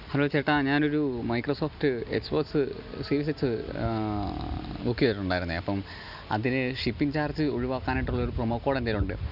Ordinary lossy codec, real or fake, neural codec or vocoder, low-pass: none; real; none; 5.4 kHz